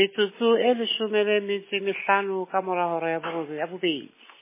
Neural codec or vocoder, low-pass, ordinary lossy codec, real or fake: none; 3.6 kHz; MP3, 16 kbps; real